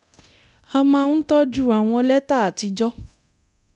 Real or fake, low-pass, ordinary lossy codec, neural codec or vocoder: fake; 10.8 kHz; none; codec, 24 kHz, 0.9 kbps, DualCodec